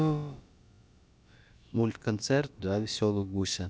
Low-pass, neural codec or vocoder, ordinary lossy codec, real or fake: none; codec, 16 kHz, about 1 kbps, DyCAST, with the encoder's durations; none; fake